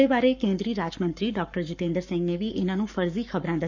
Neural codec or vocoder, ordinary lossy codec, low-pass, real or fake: codec, 44.1 kHz, 7.8 kbps, Pupu-Codec; none; 7.2 kHz; fake